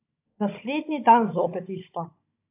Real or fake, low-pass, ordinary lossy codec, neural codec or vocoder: fake; 3.6 kHz; AAC, 24 kbps; codec, 16 kHz, 16 kbps, FunCodec, trained on Chinese and English, 50 frames a second